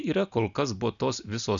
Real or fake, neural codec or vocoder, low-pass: real; none; 7.2 kHz